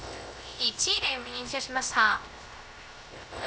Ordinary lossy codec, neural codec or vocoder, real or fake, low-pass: none; codec, 16 kHz, 0.3 kbps, FocalCodec; fake; none